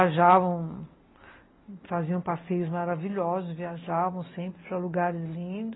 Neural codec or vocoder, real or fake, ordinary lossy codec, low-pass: none; real; AAC, 16 kbps; 7.2 kHz